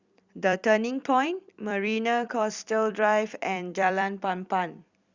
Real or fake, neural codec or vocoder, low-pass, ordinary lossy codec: fake; vocoder, 44.1 kHz, 128 mel bands every 256 samples, BigVGAN v2; 7.2 kHz; Opus, 64 kbps